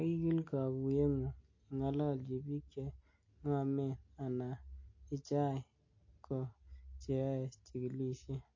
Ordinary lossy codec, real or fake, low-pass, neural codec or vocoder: MP3, 32 kbps; real; 7.2 kHz; none